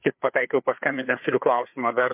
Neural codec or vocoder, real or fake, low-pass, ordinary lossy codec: codec, 16 kHz, 4 kbps, FreqCodec, larger model; fake; 3.6 kHz; MP3, 32 kbps